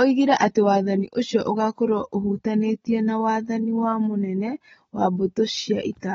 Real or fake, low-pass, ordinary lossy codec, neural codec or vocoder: real; 19.8 kHz; AAC, 24 kbps; none